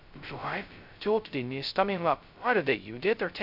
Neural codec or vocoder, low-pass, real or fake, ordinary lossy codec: codec, 16 kHz, 0.2 kbps, FocalCodec; 5.4 kHz; fake; none